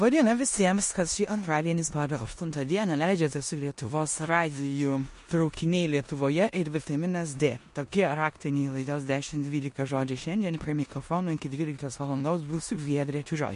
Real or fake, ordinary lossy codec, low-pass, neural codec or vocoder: fake; MP3, 48 kbps; 10.8 kHz; codec, 16 kHz in and 24 kHz out, 0.9 kbps, LongCat-Audio-Codec, four codebook decoder